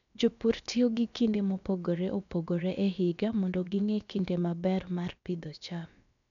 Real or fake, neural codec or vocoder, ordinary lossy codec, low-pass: fake; codec, 16 kHz, about 1 kbps, DyCAST, with the encoder's durations; none; 7.2 kHz